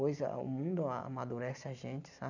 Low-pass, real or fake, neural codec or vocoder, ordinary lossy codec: 7.2 kHz; real; none; none